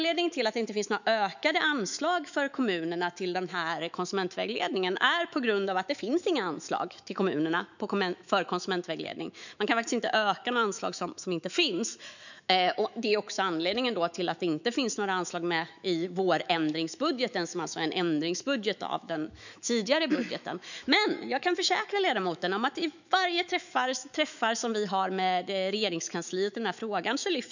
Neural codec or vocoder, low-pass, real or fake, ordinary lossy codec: autoencoder, 48 kHz, 128 numbers a frame, DAC-VAE, trained on Japanese speech; 7.2 kHz; fake; none